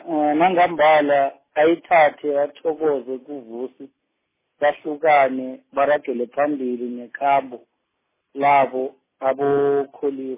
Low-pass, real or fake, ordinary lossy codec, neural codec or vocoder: 3.6 kHz; real; MP3, 16 kbps; none